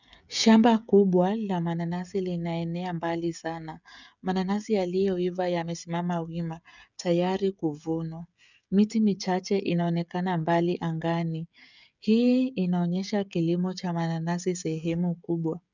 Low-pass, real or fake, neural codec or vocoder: 7.2 kHz; fake; codec, 16 kHz, 16 kbps, FreqCodec, smaller model